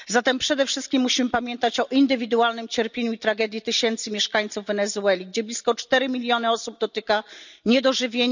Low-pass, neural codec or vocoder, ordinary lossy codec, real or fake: 7.2 kHz; none; none; real